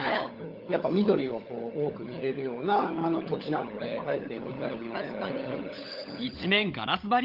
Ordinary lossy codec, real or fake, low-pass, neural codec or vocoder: Opus, 32 kbps; fake; 5.4 kHz; codec, 16 kHz, 16 kbps, FunCodec, trained on LibriTTS, 50 frames a second